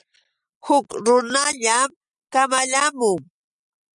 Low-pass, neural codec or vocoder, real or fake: 10.8 kHz; none; real